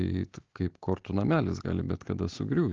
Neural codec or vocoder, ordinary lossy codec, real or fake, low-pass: none; Opus, 16 kbps; real; 7.2 kHz